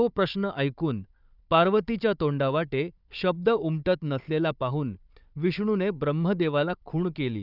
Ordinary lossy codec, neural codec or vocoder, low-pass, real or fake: none; codec, 16 kHz, 4 kbps, FunCodec, trained on Chinese and English, 50 frames a second; 5.4 kHz; fake